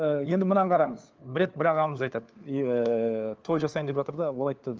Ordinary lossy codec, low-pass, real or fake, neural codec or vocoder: Opus, 24 kbps; 7.2 kHz; fake; codec, 16 kHz, 4 kbps, FunCodec, trained on LibriTTS, 50 frames a second